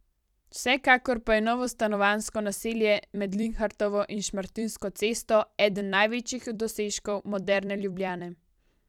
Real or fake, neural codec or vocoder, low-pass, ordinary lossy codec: fake; vocoder, 44.1 kHz, 128 mel bands every 256 samples, BigVGAN v2; 19.8 kHz; none